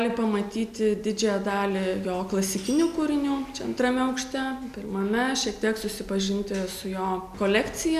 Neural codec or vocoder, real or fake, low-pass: none; real; 14.4 kHz